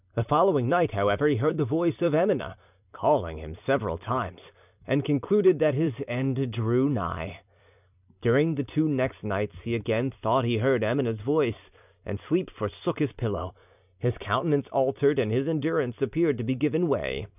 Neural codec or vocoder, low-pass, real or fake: vocoder, 44.1 kHz, 128 mel bands every 256 samples, BigVGAN v2; 3.6 kHz; fake